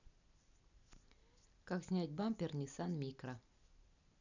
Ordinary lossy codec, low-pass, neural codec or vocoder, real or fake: none; 7.2 kHz; none; real